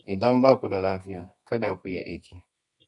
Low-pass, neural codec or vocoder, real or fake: 10.8 kHz; codec, 24 kHz, 0.9 kbps, WavTokenizer, medium music audio release; fake